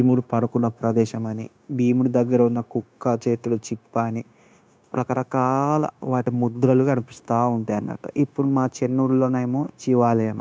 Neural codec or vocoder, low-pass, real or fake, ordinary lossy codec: codec, 16 kHz, 0.9 kbps, LongCat-Audio-Codec; none; fake; none